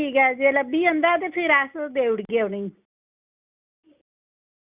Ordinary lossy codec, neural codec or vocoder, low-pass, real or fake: Opus, 64 kbps; none; 3.6 kHz; real